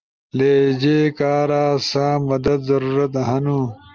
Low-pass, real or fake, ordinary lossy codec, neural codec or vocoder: 7.2 kHz; real; Opus, 24 kbps; none